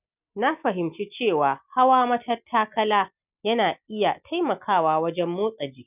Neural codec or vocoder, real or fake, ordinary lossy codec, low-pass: none; real; none; 3.6 kHz